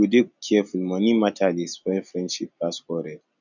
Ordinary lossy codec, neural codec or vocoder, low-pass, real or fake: none; none; 7.2 kHz; real